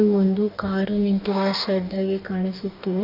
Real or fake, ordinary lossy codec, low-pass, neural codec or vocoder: fake; none; 5.4 kHz; codec, 44.1 kHz, 2.6 kbps, DAC